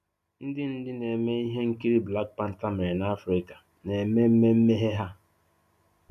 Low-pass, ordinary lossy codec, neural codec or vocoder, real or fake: 14.4 kHz; none; none; real